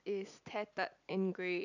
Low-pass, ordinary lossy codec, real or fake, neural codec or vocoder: 7.2 kHz; none; real; none